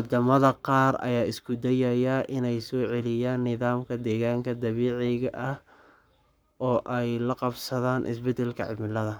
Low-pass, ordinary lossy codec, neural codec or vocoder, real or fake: none; none; codec, 44.1 kHz, 7.8 kbps, Pupu-Codec; fake